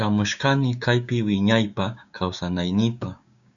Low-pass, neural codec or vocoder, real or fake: 7.2 kHz; codec, 16 kHz, 6 kbps, DAC; fake